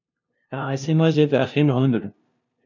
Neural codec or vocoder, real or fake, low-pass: codec, 16 kHz, 0.5 kbps, FunCodec, trained on LibriTTS, 25 frames a second; fake; 7.2 kHz